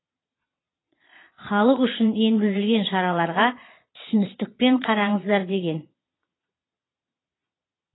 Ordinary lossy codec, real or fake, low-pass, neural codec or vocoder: AAC, 16 kbps; fake; 7.2 kHz; vocoder, 22.05 kHz, 80 mel bands, Vocos